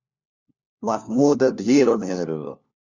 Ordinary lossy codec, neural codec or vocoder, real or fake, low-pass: Opus, 64 kbps; codec, 16 kHz, 1 kbps, FunCodec, trained on LibriTTS, 50 frames a second; fake; 7.2 kHz